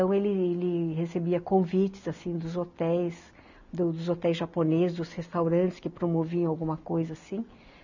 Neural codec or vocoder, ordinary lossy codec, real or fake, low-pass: none; none; real; 7.2 kHz